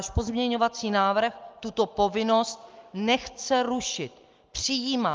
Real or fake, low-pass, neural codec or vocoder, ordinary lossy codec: real; 7.2 kHz; none; Opus, 24 kbps